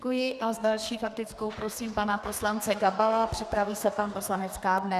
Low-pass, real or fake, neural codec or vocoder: 14.4 kHz; fake; codec, 32 kHz, 1.9 kbps, SNAC